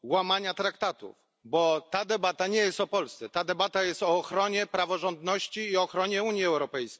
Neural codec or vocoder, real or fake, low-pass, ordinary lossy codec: none; real; none; none